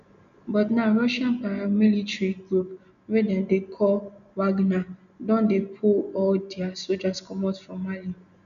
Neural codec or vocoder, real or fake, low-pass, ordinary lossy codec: none; real; 7.2 kHz; none